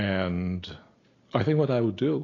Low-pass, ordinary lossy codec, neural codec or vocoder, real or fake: 7.2 kHz; AAC, 32 kbps; none; real